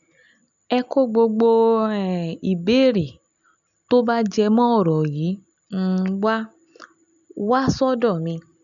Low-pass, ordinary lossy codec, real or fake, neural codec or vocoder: 7.2 kHz; none; real; none